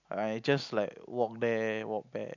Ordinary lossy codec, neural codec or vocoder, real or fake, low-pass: none; none; real; 7.2 kHz